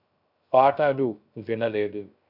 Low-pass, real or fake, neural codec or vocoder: 5.4 kHz; fake; codec, 16 kHz, 0.3 kbps, FocalCodec